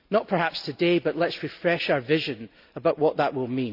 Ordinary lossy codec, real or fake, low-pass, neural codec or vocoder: none; real; 5.4 kHz; none